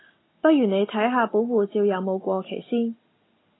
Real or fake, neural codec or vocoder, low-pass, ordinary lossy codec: real; none; 7.2 kHz; AAC, 16 kbps